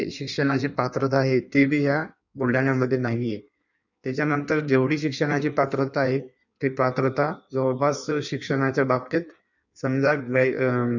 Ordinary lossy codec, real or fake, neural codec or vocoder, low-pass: none; fake; codec, 16 kHz in and 24 kHz out, 1.1 kbps, FireRedTTS-2 codec; 7.2 kHz